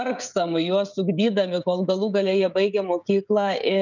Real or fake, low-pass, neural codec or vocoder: fake; 7.2 kHz; codec, 16 kHz, 16 kbps, FreqCodec, smaller model